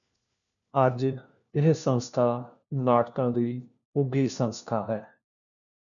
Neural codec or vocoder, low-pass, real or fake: codec, 16 kHz, 1 kbps, FunCodec, trained on LibriTTS, 50 frames a second; 7.2 kHz; fake